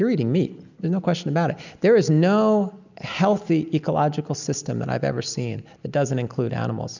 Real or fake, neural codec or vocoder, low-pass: real; none; 7.2 kHz